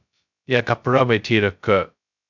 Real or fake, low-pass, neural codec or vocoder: fake; 7.2 kHz; codec, 16 kHz, 0.2 kbps, FocalCodec